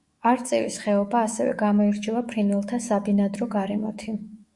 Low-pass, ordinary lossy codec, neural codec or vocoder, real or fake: 10.8 kHz; Opus, 64 kbps; autoencoder, 48 kHz, 128 numbers a frame, DAC-VAE, trained on Japanese speech; fake